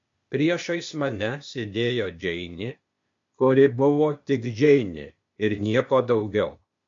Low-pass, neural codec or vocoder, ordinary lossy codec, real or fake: 7.2 kHz; codec, 16 kHz, 0.8 kbps, ZipCodec; MP3, 48 kbps; fake